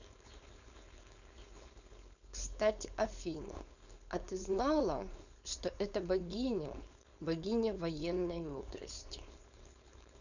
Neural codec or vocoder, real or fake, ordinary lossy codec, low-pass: codec, 16 kHz, 4.8 kbps, FACodec; fake; none; 7.2 kHz